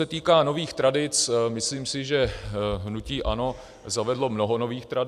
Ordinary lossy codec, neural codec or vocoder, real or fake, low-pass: AAC, 96 kbps; none; real; 14.4 kHz